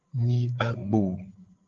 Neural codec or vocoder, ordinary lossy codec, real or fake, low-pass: codec, 16 kHz, 8 kbps, FreqCodec, larger model; Opus, 16 kbps; fake; 7.2 kHz